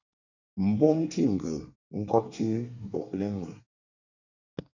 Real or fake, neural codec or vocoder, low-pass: fake; codec, 24 kHz, 1 kbps, SNAC; 7.2 kHz